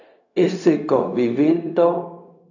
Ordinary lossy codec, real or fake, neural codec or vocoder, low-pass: AAC, 48 kbps; fake; codec, 16 kHz, 0.4 kbps, LongCat-Audio-Codec; 7.2 kHz